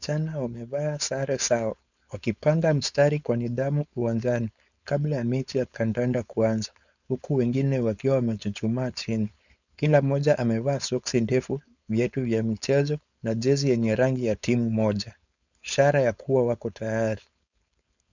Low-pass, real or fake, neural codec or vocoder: 7.2 kHz; fake; codec, 16 kHz, 4.8 kbps, FACodec